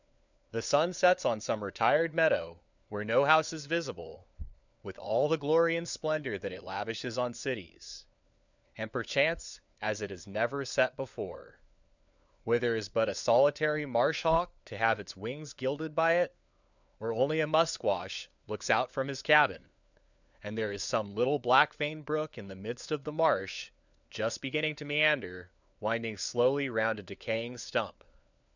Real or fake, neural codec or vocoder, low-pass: fake; codec, 16 kHz, 4 kbps, FunCodec, trained on LibriTTS, 50 frames a second; 7.2 kHz